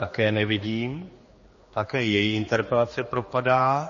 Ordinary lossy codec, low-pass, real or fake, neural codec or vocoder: MP3, 32 kbps; 7.2 kHz; fake; codec, 16 kHz, 2 kbps, X-Codec, HuBERT features, trained on general audio